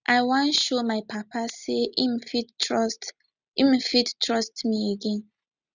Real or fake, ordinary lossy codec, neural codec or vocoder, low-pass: real; none; none; 7.2 kHz